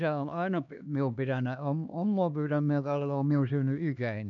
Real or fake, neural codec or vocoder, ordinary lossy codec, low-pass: fake; codec, 16 kHz, 2 kbps, X-Codec, HuBERT features, trained on LibriSpeech; none; 7.2 kHz